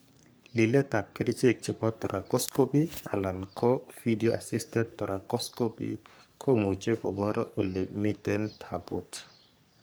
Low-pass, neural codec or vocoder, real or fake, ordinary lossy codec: none; codec, 44.1 kHz, 3.4 kbps, Pupu-Codec; fake; none